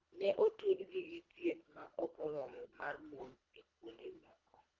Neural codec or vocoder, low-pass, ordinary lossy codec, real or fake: codec, 24 kHz, 1.5 kbps, HILCodec; 7.2 kHz; Opus, 32 kbps; fake